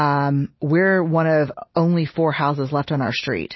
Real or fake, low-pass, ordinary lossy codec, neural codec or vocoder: real; 7.2 kHz; MP3, 24 kbps; none